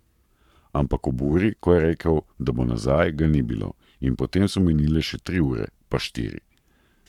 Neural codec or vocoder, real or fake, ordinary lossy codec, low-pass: codec, 44.1 kHz, 7.8 kbps, Pupu-Codec; fake; none; 19.8 kHz